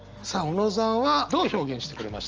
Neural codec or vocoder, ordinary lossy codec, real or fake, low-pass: none; Opus, 24 kbps; real; 7.2 kHz